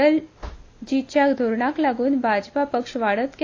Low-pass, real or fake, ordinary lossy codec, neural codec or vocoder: 7.2 kHz; real; AAC, 32 kbps; none